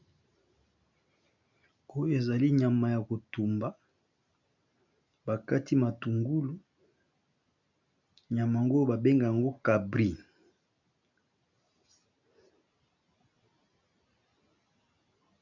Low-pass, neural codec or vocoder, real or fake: 7.2 kHz; none; real